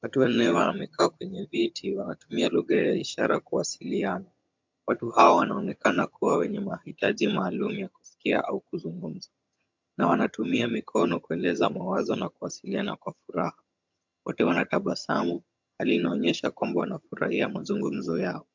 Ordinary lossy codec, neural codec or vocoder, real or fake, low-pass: MP3, 64 kbps; vocoder, 22.05 kHz, 80 mel bands, HiFi-GAN; fake; 7.2 kHz